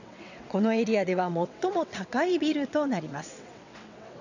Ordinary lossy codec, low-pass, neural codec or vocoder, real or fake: none; 7.2 kHz; vocoder, 22.05 kHz, 80 mel bands, WaveNeXt; fake